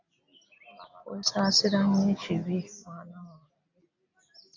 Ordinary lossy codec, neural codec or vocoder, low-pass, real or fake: AAC, 48 kbps; none; 7.2 kHz; real